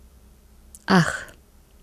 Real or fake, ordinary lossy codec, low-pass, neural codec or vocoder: real; none; 14.4 kHz; none